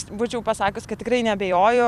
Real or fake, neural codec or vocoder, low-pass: fake; vocoder, 44.1 kHz, 128 mel bands every 256 samples, BigVGAN v2; 14.4 kHz